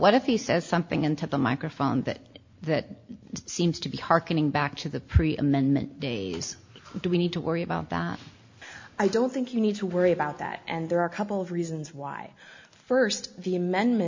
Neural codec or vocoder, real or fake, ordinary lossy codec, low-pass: none; real; MP3, 48 kbps; 7.2 kHz